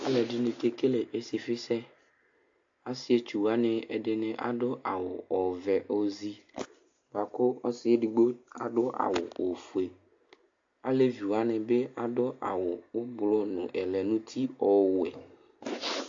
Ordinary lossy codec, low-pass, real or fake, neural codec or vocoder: AAC, 48 kbps; 7.2 kHz; real; none